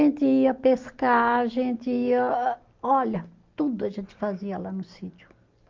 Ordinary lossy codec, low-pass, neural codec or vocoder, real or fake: Opus, 32 kbps; 7.2 kHz; none; real